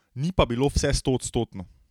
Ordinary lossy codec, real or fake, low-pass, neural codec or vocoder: none; real; 19.8 kHz; none